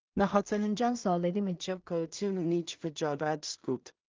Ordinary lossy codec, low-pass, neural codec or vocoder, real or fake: Opus, 32 kbps; 7.2 kHz; codec, 16 kHz in and 24 kHz out, 0.4 kbps, LongCat-Audio-Codec, two codebook decoder; fake